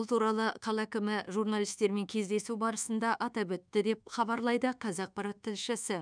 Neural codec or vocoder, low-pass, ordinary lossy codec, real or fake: codec, 24 kHz, 1.2 kbps, DualCodec; 9.9 kHz; none; fake